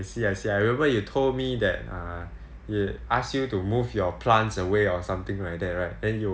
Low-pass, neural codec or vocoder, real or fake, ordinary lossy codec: none; none; real; none